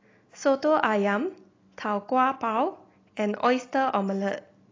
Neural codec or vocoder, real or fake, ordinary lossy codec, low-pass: none; real; AAC, 32 kbps; 7.2 kHz